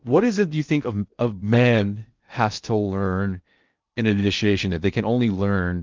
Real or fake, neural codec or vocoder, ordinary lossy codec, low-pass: fake; codec, 16 kHz in and 24 kHz out, 0.6 kbps, FocalCodec, streaming, 4096 codes; Opus, 24 kbps; 7.2 kHz